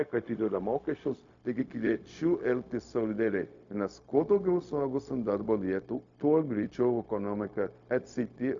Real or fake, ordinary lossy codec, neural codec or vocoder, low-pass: fake; AAC, 64 kbps; codec, 16 kHz, 0.4 kbps, LongCat-Audio-Codec; 7.2 kHz